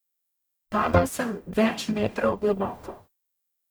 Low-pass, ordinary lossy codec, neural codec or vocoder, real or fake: none; none; codec, 44.1 kHz, 0.9 kbps, DAC; fake